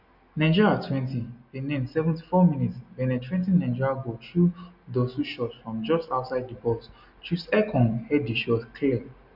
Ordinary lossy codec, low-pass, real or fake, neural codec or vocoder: none; 5.4 kHz; real; none